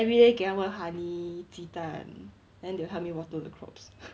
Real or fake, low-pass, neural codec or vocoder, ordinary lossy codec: real; none; none; none